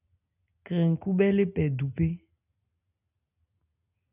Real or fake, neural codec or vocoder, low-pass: real; none; 3.6 kHz